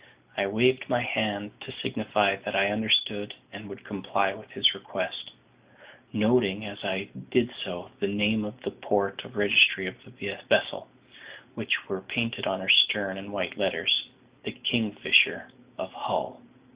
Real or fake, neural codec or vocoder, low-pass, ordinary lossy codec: real; none; 3.6 kHz; Opus, 64 kbps